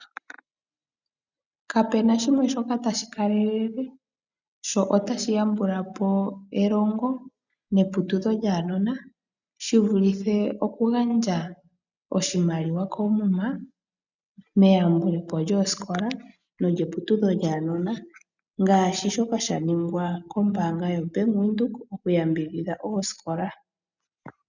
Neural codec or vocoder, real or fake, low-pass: none; real; 7.2 kHz